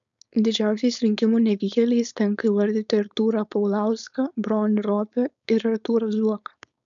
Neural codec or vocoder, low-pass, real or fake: codec, 16 kHz, 4.8 kbps, FACodec; 7.2 kHz; fake